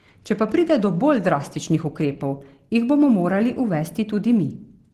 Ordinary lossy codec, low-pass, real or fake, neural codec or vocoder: Opus, 16 kbps; 14.4 kHz; real; none